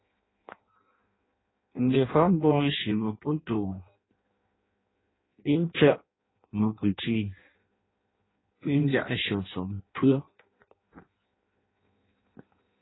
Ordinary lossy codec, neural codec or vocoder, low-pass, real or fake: AAC, 16 kbps; codec, 16 kHz in and 24 kHz out, 0.6 kbps, FireRedTTS-2 codec; 7.2 kHz; fake